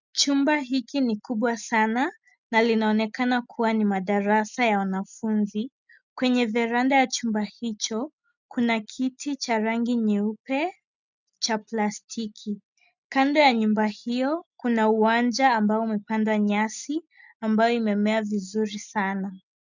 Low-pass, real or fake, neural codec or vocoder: 7.2 kHz; real; none